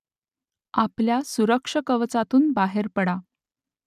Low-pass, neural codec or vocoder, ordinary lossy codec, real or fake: 14.4 kHz; none; none; real